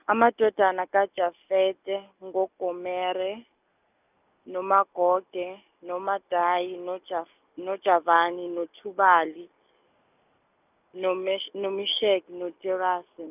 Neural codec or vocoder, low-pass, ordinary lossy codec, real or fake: none; 3.6 kHz; none; real